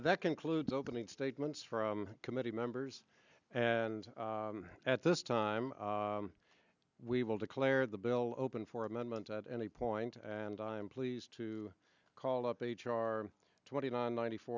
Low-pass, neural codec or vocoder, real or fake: 7.2 kHz; none; real